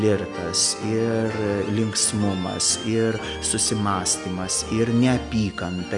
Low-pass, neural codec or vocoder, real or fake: 10.8 kHz; none; real